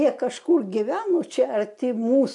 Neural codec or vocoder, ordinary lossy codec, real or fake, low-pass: none; MP3, 64 kbps; real; 10.8 kHz